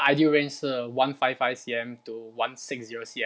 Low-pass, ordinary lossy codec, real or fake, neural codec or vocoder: none; none; real; none